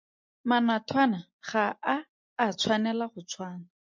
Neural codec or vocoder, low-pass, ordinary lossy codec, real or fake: none; 7.2 kHz; MP3, 48 kbps; real